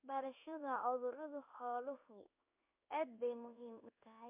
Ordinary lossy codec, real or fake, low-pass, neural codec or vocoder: MP3, 32 kbps; fake; 3.6 kHz; codec, 16 kHz in and 24 kHz out, 1 kbps, XY-Tokenizer